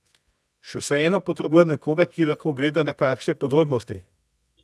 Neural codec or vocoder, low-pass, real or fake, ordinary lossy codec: codec, 24 kHz, 0.9 kbps, WavTokenizer, medium music audio release; none; fake; none